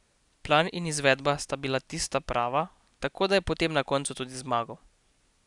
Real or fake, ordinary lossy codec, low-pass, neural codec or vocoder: real; none; 10.8 kHz; none